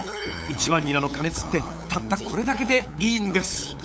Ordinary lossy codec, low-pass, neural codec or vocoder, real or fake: none; none; codec, 16 kHz, 8 kbps, FunCodec, trained on LibriTTS, 25 frames a second; fake